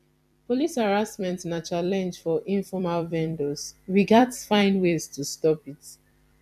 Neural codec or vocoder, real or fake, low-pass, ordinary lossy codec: vocoder, 44.1 kHz, 128 mel bands every 256 samples, BigVGAN v2; fake; 14.4 kHz; none